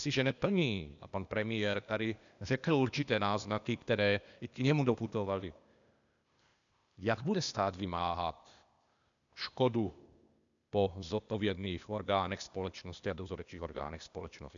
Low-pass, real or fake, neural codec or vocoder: 7.2 kHz; fake; codec, 16 kHz, 0.8 kbps, ZipCodec